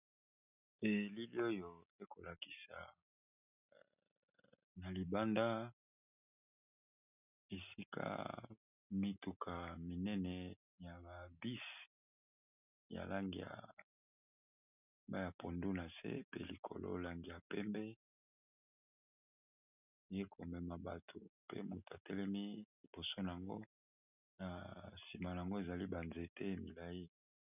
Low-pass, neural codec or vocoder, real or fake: 3.6 kHz; none; real